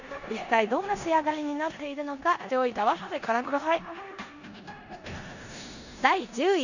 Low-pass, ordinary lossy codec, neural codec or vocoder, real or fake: 7.2 kHz; none; codec, 16 kHz in and 24 kHz out, 0.9 kbps, LongCat-Audio-Codec, four codebook decoder; fake